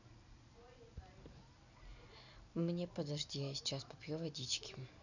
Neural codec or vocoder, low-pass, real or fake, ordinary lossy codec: none; 7.2 kHz; real; none